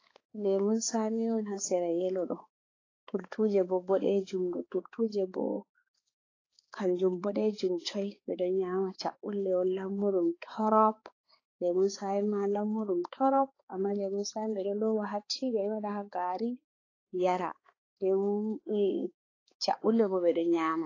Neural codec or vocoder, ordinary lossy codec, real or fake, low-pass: codec, 16 kHz, 4 kbps, X-Codec, HuBERT features, trained on balanced general audio; AAC, 32 kbps; fake; 7.2 kHz